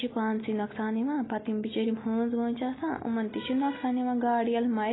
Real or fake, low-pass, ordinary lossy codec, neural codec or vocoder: real; 7.2 kHz; AAC, 16 kbps; none